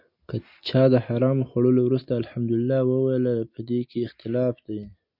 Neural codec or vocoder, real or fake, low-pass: none; real; 5.4 kHz